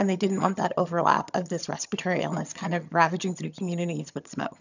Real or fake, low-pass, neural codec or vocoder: fake; 7.2 kHz; vocoder, 22.05 kHz, 80 mel bands, HiFi-GAN